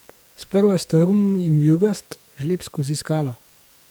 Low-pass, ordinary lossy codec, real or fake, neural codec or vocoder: none; none; fake; codec, 44.1 kHz, 2.6 kbps, SNAC